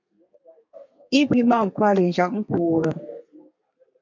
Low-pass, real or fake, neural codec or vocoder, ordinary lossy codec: 7.2 kHz; fake; codec, 32 kHz, 1.9 kbps, SNAC; MP3, 48 kbps